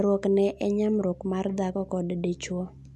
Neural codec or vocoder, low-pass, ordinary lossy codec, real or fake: none; none; none; real